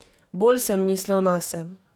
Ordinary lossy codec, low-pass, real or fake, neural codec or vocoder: none; none; fake; codec, 44.1 kHz, 2.6 kbps, DAC